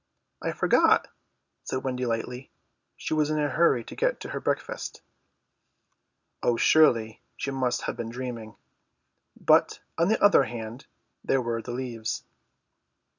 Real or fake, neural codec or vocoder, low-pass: real; none; 7.2 kHz